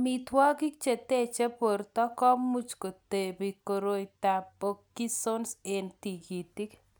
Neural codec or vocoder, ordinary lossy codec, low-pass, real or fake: none; none; none; real